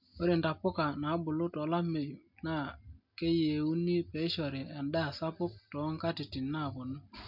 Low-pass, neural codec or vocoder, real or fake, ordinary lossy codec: 5.4 kHz; none; real; MP3, 48 kbps